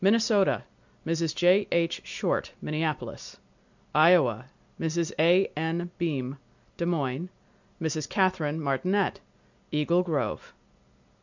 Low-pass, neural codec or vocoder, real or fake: 7.2 kHz; none; real